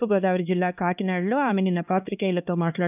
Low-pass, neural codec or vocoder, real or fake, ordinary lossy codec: 3.6 kHz; codec, 16 kHz, 2 kbps, X-Codec, HuBERT features, trained on LibriSpeech; fake; none